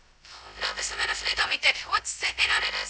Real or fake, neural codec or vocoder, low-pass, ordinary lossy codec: fake; codec, 16 kHz, 0.2 kbps, FocalCodec; none; none